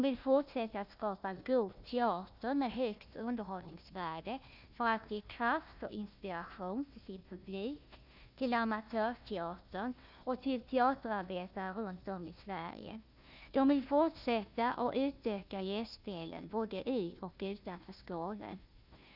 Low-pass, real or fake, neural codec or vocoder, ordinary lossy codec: 5.4 kHz; fake; codec, 16 kHz, 1 kbps, FunCodec, trained on Chinese and English, 50 frames a second; none